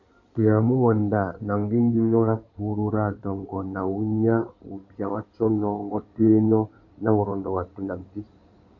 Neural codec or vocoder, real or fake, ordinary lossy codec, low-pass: codec, 16 kHz in and 24 kHz out, 2.2 kbps, FireRedTTS-2 codec; fake; AAC, 48 kbps; 7.2 kHz